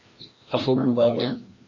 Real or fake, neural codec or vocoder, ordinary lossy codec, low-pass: fake; codec, 16 kHz, 1 kbps, FreqCodec, larger model; MP3, 32 kbps; 7.2 kHz